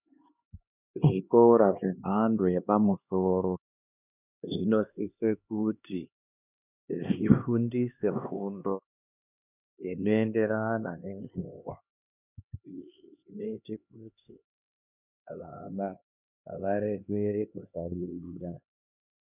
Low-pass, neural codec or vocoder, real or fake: 3.6 kHz; codec, 16 kHz, 2 kbps, X-Codec, HuBERT features, trained on LibriSpeech; fake